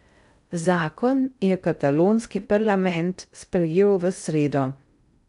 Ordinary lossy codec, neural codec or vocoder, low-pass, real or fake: none; codec, 16 kHz in and 24 kHz out, 0.6 kbps, FocalCodec, streaming, 2048 codes; 10.8 kHz; fake